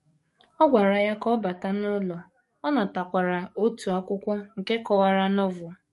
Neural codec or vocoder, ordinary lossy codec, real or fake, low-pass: codec, 44.1 kHz, 7.8 kbps, DAC; MP3, 48 kbps; fake; 14.4 kHz